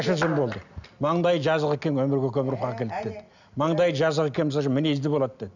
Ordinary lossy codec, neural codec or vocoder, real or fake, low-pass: none; none; real; 7.2 kHz